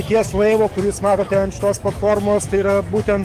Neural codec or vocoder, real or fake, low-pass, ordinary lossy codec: codec, 44.1 kHz, 7.8 kbps, DAC; fake; 14.4 kHz; Opus, 32 kbps